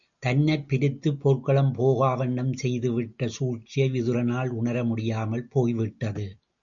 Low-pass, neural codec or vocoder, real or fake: 7.2 kHz; none; real